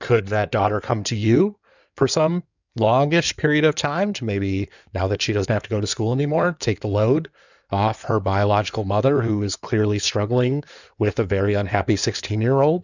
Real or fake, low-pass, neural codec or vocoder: fake; 7.2 kHz; codec, 16 kHz in and 24 kHz out, 2.2 kbps, FireRedTTS-2 codec